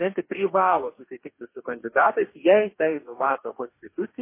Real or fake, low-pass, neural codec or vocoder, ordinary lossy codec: fake; 3.6 kHz; codec, 44.1 kHz, 2.6 kbps, DAC; MP3, 24 kbps